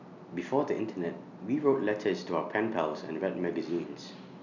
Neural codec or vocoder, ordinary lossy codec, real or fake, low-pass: none; none; real; 7.2 kHz